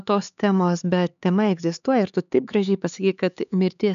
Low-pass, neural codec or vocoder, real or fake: 7.2 kHz; codec, 16 kHz, 4 kbps, X-Codec, HuBERT features, trained on LibriSpeech; fake